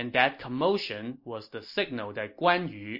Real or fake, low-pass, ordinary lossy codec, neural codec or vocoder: real; 5.4 kHz; MP3, 32 kbps; none